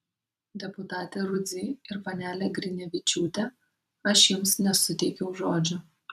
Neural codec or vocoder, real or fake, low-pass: vocoder, 44.1 kHz, 128 mel bands every 256 samples, BigVGAN v2; fake; 14.4 kHz